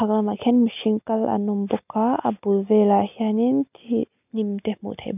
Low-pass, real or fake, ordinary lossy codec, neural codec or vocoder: 3.6 kHz; real; none; none